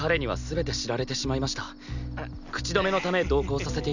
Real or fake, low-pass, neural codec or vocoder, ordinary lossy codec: real; 7.2 kHz; none; none